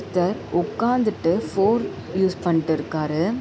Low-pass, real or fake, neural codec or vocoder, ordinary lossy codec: none; real; none; none